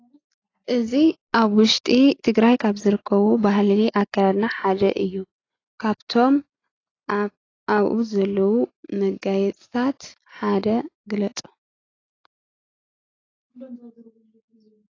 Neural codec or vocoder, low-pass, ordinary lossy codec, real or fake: none; 7.2 kHz; AAC, 32 kbps; real